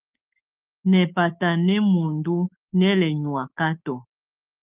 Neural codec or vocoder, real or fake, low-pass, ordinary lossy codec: codec, 16 kHz, 6 kbps, DAC; fake; 3.6 kHz; Opus, 24 kbps